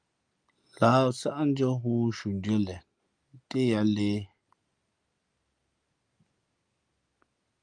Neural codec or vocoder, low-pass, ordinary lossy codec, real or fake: none; 9.9 kHz; Opus, 32 kbps; real